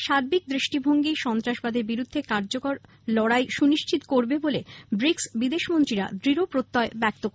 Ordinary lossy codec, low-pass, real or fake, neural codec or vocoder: none; none; real; none